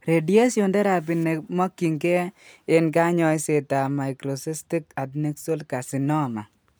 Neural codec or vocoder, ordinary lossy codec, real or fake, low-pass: none; none; real; none